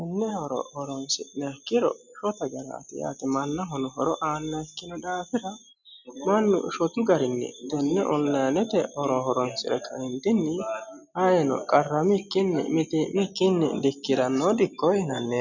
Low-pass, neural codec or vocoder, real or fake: 7.2 kHz; none; real